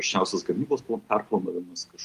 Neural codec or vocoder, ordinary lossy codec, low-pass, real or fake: none; Opus, 32 kbps; 14.4 kHz; real